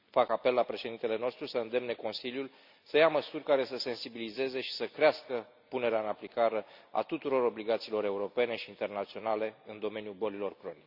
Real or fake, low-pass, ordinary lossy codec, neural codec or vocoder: real; 5.4 kHz; none; none